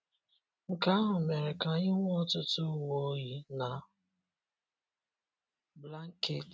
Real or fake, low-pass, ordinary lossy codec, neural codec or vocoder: real; none; none; none